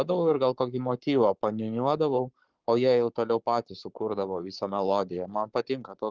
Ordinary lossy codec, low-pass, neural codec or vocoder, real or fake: Opus, 32 kbps; 7.2 kHz; codec, 44.1 kHz, 7.8 kbps, Pupu-Codec; fake